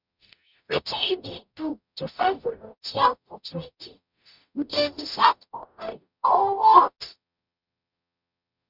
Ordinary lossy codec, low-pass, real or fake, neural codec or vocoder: none; 5.4 kHz; fake; codec, 44.1 kHz, 0.9 kbps, DAC